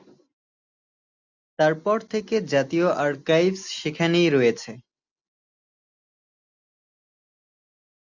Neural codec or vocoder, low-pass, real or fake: none; 7.2 kHz; real